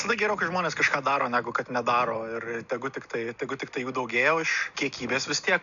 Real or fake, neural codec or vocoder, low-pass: real; none; 7.2 kHz